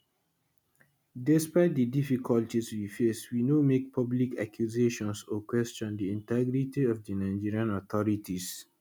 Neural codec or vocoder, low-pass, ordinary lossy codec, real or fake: none; none; none; real